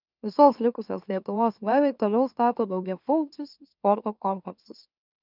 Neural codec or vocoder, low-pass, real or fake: autoencoder, 44.1 kHz, a latent of 192 numbers a frame, MeloTTS; 5.4 kHz; fake